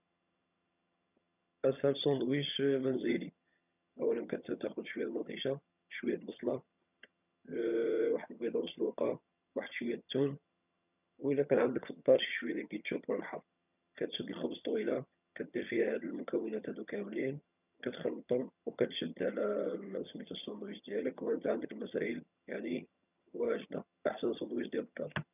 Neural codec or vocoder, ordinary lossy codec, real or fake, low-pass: vocoder, 22.05 kHz, 80 mel bands, HiFi-GAN; none; fake; 3.6 kHz